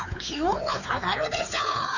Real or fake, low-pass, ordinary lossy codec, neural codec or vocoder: fake; 7.2 kHz; none; codec, 16 kHz, 4 kbps, X-Codec, WavLM features, trained on Multilingual LibriSpeech